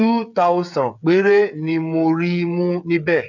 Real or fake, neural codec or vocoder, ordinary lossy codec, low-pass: fake; codec, 16 kHz, 8 kbps, FreqCodec, smaller model; none; 7.2 kHz